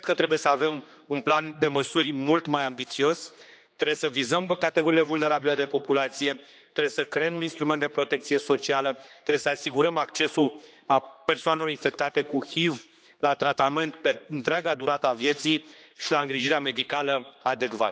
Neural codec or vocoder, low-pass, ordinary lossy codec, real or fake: codec, 16 kHz, 2 kbps, X-Codec, HuBERT features, trained on general audio; none; none; fake